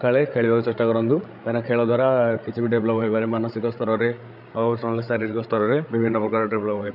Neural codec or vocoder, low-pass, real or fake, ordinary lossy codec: codec, 16 kHz, 8 kbps, FreqCodec, larger model; 5.4 kHz; fake; none